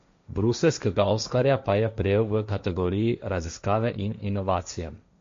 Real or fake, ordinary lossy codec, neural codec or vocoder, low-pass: fake; MP3, 48 kbps; codec, 16 kHz, 1.1 kbps, Voila-Tokenizer; 7.2 kHz